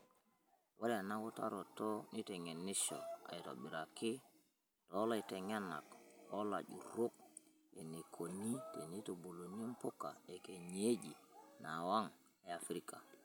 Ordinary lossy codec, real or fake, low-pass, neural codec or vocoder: none; real; none; none